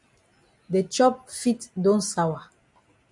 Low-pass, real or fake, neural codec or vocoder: 10.8 kHz; real; none